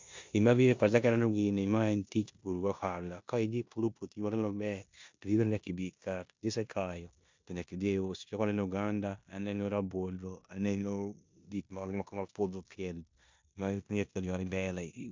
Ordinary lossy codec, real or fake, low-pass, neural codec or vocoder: MP3, 64 kbps; fake; 7.2 kHz; codec, 16 kHz in and 24 kHz out, 0.9 kbps, LongCat-Audio-Codec, four codebook decoder